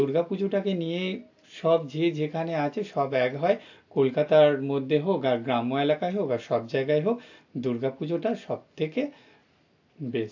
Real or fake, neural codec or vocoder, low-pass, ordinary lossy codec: real; none; 7.2 kHz; AAC, 48 kbps